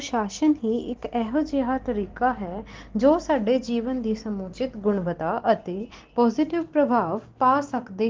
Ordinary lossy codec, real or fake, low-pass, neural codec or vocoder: Opus, 16 kbps; real; 7.2 kHz; none